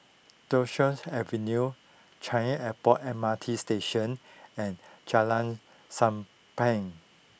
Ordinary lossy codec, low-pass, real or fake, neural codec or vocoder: none; none; real; none